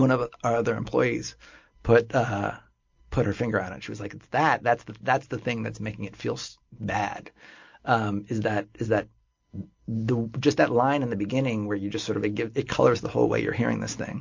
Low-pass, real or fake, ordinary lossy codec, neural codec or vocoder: 7.2 kHz; real; MP3, 48 kbps; none